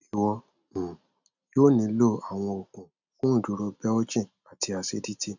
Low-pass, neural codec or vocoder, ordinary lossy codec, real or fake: 7.2 kHz; none; none; real